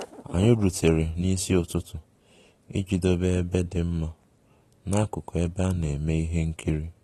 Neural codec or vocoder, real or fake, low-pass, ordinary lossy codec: none; real; 19.8 kHz; AAC, 32 kbps